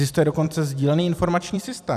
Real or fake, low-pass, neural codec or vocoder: fake; 14.4 kHz; vocoder, 44.1 kHz, 128 mel bands every 256 samples, BigVGAN v2